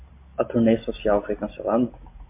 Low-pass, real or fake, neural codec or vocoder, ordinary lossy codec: 3.6 kHz; real; none; MP3, 24 kbps